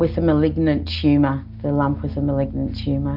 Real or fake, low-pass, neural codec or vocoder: real; 5.4 kHz; none